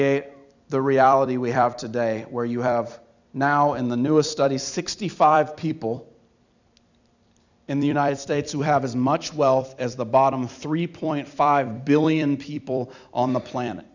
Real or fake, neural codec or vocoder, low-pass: fake; vocoder, 44.1 kHz, 128 mel bands every 256 samples, BigVGAN v2; 7.2 kHz